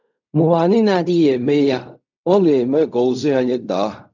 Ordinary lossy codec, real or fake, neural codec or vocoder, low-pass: none; fake; codec, 16 kHz in and 24 kHz out, 0.4 kbps, LongCat-Audio-Codec, fine tuned four codebook decoder; 7.2 kHz